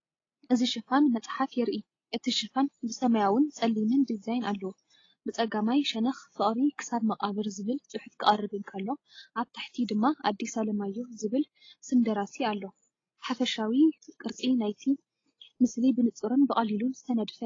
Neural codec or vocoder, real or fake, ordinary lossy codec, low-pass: none; real; AAC, 32 kbps; 7.2 kHz